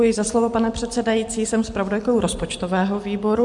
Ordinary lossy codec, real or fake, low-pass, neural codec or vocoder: MP3, 64 kbps; real; 10.8 kHz; none